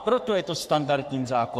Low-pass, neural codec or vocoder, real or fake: 14.4 kHz; codec, 44.1 kHz, 3.4 kbps, Pupu-Codec; fake